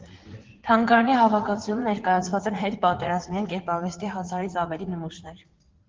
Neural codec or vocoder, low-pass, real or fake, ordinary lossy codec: vocoder, 44.1 kHz, 80 mel bands, Vocos; 7.2 kHz; fake; Opus, 16 kbps